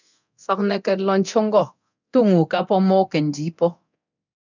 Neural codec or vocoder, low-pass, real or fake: codec, 24 kHz, 0.9 kbps, DualCodec; 7.2 kHz; fake